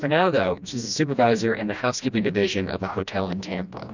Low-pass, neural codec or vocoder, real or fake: 7.2 kHz; codec, 16 kHz, 1 kbps, FreqCodec, smaller model; fake